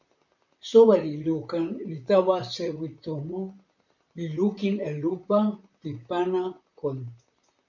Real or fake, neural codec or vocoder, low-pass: fake; vocoder, 44.1 kHz, 128 mel bands, Pupu-Vocoder; 7.2 kHz